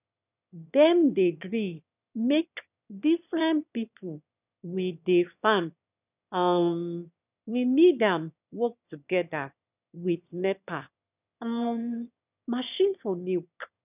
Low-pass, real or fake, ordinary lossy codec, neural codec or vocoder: 3.6 kHz; fake; none; autoencoder, 22.05 kHz, a latent of 192 numbers a frame, VITS, trained on one speaker